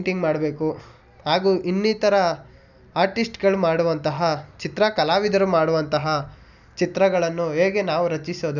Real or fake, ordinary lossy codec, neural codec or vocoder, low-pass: real; none; none; 7.2 kHz